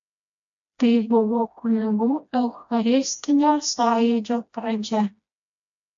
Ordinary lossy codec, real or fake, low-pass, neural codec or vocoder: AAC, 64 kbps; fake; 7.2 kHz; codec, 16 kHz, 1 kbps, FreqCodec, smaller model